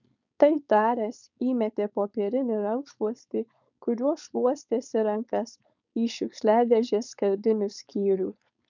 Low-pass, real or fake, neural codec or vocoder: 7.2 kHz; fake; codec, 16 kHz, 4.8 kbps, FACodec